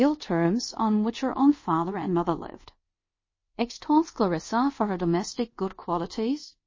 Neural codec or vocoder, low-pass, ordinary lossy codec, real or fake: codec, 24 kHz, 0.5 kbps, DualCodec; 7.2 kHz; MP3, 32 kbps; fake